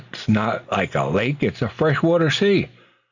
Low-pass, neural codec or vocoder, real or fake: 7.2 kHz; none; real